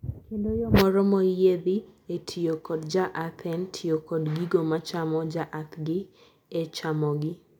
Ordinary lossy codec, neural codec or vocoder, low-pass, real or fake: none; none; 19.8 kHz; real